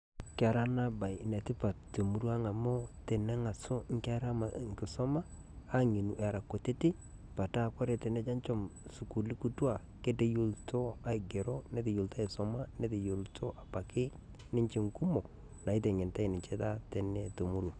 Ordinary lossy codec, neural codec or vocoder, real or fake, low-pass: none; none; real; 9.9 kHz